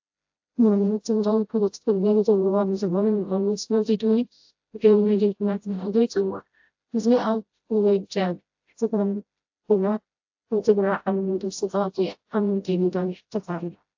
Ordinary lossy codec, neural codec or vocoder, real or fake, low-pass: AAC, 48 kbps; codec, 16 kHz, 0.5 kbps, FreqCodec, smaller model; fake; 7.2 kHz